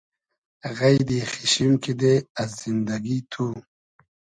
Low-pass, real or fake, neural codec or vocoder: 9.9 kHz; real; none